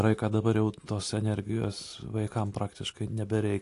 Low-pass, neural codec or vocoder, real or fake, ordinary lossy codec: 10.8 kHz; none; real; AAC, 48 kbps